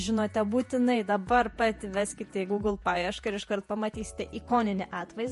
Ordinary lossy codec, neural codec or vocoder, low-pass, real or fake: MP3, 48 kbps; vocoder, 48 kHz, 128 mel bands, Vocos; 14.4 kHz; fake